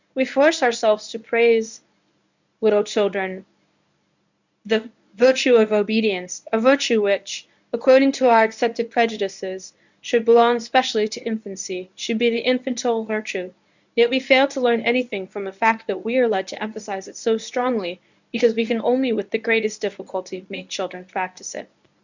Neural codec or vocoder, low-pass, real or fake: codec, 24 kHz, 0.9 kbps, WavTokenizer, medium speech release version 1; 7.2 kHz; fake